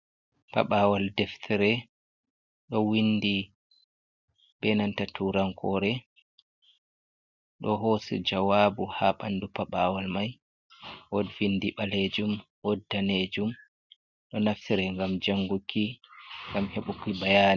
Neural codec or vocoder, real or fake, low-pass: none; real; 7.2 kHz